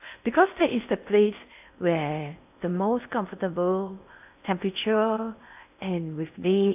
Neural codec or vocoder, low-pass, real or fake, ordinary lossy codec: codec, 16 kHz in and 24 kHz out, 0.6 kbps, FocalCodec, streaming, 4096 codes; 3.6 kHz; fake; AAC, 32 kbps